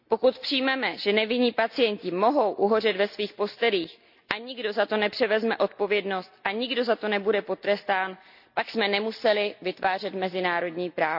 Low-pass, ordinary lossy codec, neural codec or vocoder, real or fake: 5.4 kHz; none; none; real